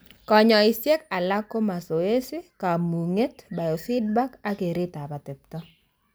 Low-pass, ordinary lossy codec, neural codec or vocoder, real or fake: none; none; none; real